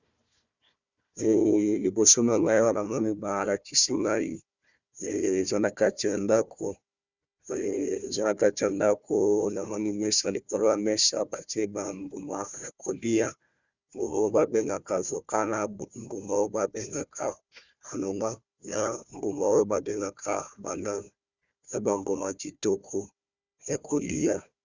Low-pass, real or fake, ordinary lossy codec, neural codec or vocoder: 7.2 kHz; fake; Opus, 64 kbps; codec, 16 kHz, 1 kbps, FunCodec, trained on Chinese and English, 50 frames a second